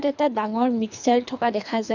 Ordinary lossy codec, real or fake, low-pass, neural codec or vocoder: none; fake; 7.2 kHz; codec, 24 kHz, 3 kbps, HILCodec